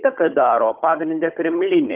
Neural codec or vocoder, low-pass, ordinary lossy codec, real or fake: codec, 16 kHz, 16 kbps, FunCodec, trained on Chinese and English, 50 frames a second; 3.6 kHz; Opus, 32 kbps; fake